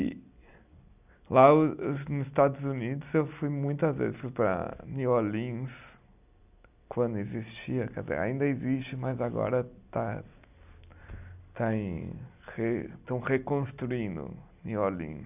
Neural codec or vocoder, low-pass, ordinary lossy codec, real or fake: none; 3.6 kHz; none; real